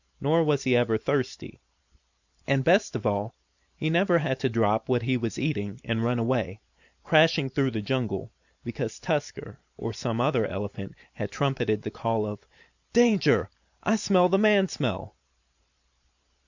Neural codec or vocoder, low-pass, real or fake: vocoder, 44.1 kHz, 128 mel bands every 512 samples, BigVGAN v2; 7.2 kHz; fake